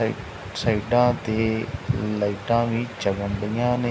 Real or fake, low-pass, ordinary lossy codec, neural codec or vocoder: real; none; none; none